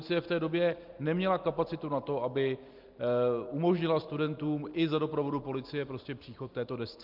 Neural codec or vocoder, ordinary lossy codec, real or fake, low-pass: none; Opus, 32 kbps; real; 5.4 kHz